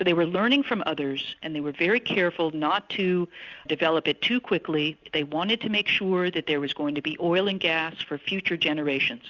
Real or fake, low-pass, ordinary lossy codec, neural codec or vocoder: real; 7.2 kHz; Opus, 64 kbps; none